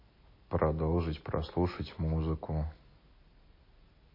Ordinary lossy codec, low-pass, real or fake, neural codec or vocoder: MP3, 24 kbps; 5.4 kHz; real; none